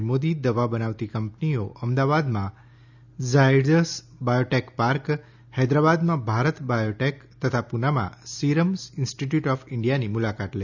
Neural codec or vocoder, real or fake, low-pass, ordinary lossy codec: none; real; 7.2 kHz; none